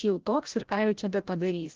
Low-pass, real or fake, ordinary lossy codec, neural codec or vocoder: 7.2 kHz; fake; Opus, 16 kbps; codec, 16 kHz, 0.5 kbps, FreqCodec, larger model